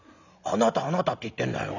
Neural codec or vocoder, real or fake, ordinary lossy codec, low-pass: none; real; none; 7.2 kHz